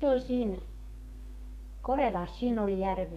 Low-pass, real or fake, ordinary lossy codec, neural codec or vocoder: 14.4 kHz; fake; none; codec, 32 kHz, 1.9 kbps, SNAC